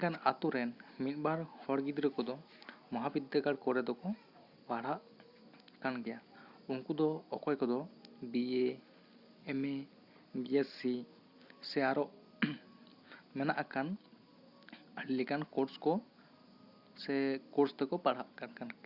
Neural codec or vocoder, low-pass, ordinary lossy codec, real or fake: none; 5.4 kHz; Opus, 64 kbps; real